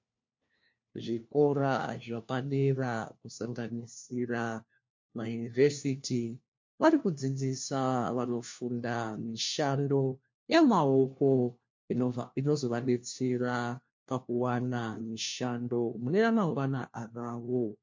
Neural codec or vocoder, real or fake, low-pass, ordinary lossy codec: codec, 16 kHz, 1 kbps, FunCodec, trained on LibriTTS, 50 frames a second; fake; 7.2 kHz; MP3, 48 kbps